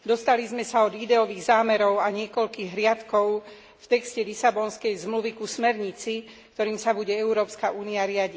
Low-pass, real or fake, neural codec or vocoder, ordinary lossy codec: none; real; none; none